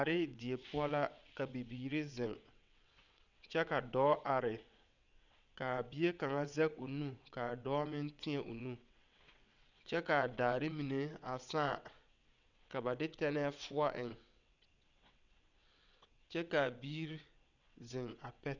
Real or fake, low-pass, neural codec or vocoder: fake; 7.2 kHz; vocoder, 22.05 kHz, 80 mel bands, WaveNeXt